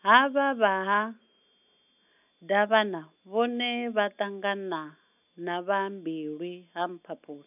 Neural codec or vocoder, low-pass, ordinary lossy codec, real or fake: none; 3.6 kHz; none; real